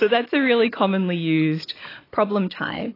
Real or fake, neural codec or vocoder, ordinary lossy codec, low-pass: real; none; AAC, 24 kbps; 5.4 kHz